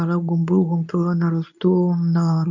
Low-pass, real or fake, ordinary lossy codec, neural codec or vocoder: 7.2 kHz; fake; none; codec, 24 kHz, 0.9 kbps, WavTokenizer, medium speech release version 2